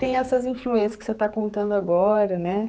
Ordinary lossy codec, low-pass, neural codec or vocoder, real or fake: none; none; codec, 16 kHz, 4 kbps, X-Codec, HuBERT features, trained on general audio; fake